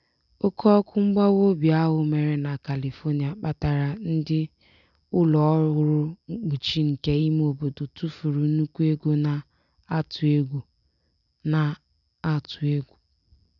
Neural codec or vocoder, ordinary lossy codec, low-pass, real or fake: none; none; 7.2 kHz; real